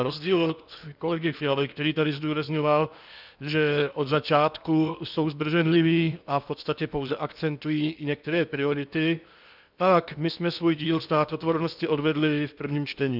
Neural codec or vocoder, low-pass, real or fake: codec, 16 kHz in and 24 kHz out, 0.8 kbps, FocalCodec, streaming, 65536 codes; 5.4 kHz; fake